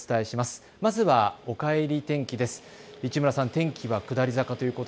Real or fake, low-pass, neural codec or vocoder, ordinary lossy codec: real; none; none; none